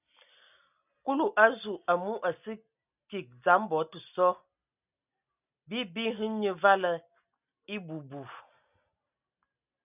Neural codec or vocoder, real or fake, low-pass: none; real; 3.6 kHz